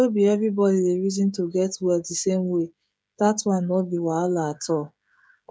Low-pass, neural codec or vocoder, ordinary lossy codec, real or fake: none; codec, 16 kHz, 16 kbps, FreqCodec, smaller model; none; fake